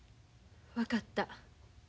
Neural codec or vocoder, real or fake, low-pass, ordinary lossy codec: none; real; none; none